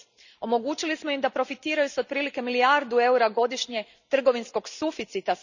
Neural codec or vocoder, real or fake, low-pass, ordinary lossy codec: none; real; 7.2 kHz; none